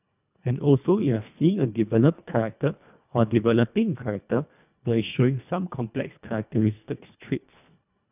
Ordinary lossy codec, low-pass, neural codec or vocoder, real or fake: none; 3.6 kHz; codec, 24 kHz, 1.5 kbps, HILCodec; fake